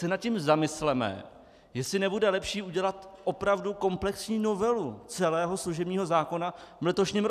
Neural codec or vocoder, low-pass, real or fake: none; 14.4 kHz; real